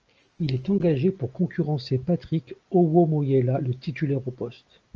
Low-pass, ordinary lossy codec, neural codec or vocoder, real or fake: 7.2 kHz; Opus, 24 kbps; none; real